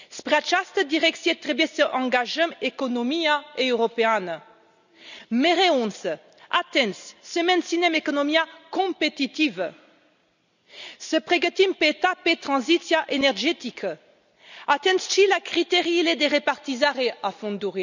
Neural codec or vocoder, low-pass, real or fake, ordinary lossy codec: none; 7.2 kHz; real; none